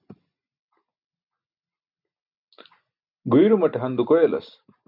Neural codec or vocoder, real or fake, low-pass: none; real; 5.4 kHz